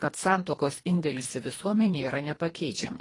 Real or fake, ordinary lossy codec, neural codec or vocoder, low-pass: fake; AAC, 32 kbps; codec, 24 kHz, 1.5 kbps, HILCodec; 10.8 kHz